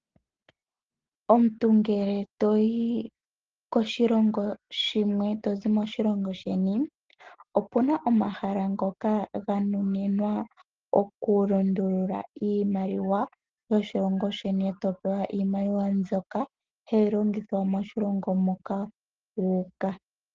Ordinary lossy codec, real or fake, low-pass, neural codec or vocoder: Opus, 16 kbps; real; 7.2 kHz; none